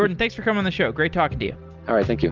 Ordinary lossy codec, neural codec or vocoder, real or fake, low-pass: Opus, 24 kbps; none; real; 7.2 kHz